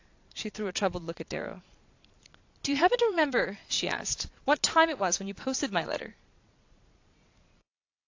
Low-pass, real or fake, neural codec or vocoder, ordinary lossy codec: 7.2 kHz; fake; vocoder, 22.05 kHz, 80 mel bands, Vocos; AAC, 48 kbps